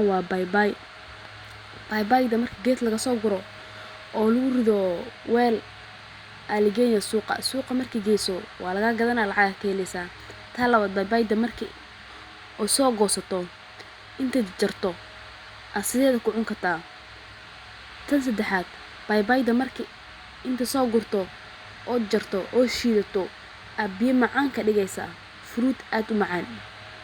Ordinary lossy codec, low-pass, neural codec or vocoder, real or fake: Opus, 64 kbps; 19.8 kHz; none; real